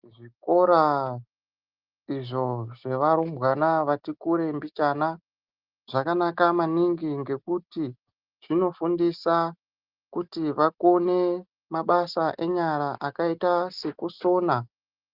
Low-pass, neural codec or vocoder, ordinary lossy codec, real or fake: 5.4 kHz; none; Opus, 32 kbps; real